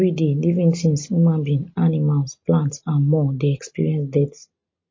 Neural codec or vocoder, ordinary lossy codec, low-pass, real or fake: none; MP3, 32 kbps; 7.2 kHz; real